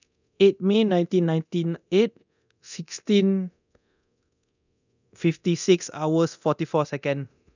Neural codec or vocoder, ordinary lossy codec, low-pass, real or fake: codec, 24 kHz, 0.9 kbps, DualCodec; none; 7.2 kHz; fake